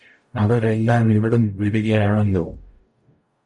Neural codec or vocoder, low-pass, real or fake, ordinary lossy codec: codec, 44.1 kHz, 0.9 kbps, DAC; 10.8 kHz; fake; MP3, 48 kbps